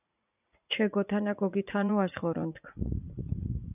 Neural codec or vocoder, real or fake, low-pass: vocoder, 44.1 kHz, 80 mel bands, Vocos; fake; 3.6 kHz